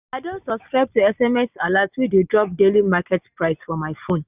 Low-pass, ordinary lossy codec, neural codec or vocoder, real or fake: 3.6 kHz; none; none; real